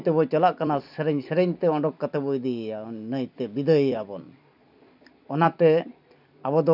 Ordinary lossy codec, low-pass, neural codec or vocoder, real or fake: none; 5.4 kHz; vocoder, 44.1 kHz, 128 mel bands every 256 samples, BigVGAN v2; fake